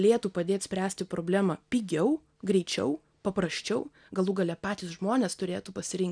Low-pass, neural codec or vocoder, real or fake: 9.9 kHz; none; real